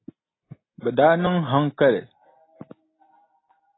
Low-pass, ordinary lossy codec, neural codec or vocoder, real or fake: 7.2 kHz; AAC, 16 kbps; none; real